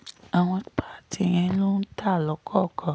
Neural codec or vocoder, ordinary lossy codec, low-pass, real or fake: none; none; none; real